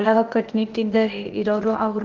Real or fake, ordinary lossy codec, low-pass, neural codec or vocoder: fake; Opus, 16 kbps; 7.2 kHz; codec, 16 kHz, 0.8 kbps, ZipCodec